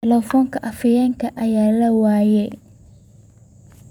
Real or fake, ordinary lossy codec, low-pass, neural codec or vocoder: fake; none; 19.8 kHz; vocoder, 44.1 kHz, 128 mel bands every 256 samples, BigVGAN v2